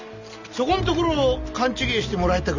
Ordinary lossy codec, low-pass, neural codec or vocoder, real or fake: none; 7.2 kHz; none; real